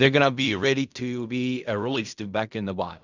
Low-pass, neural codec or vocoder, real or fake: 7.2 kHz; codec, 16 kHz in and 24 kHz out, 0.4 kbps, LongCat-Audio-Codec, fine tuned four codebook decoder; fake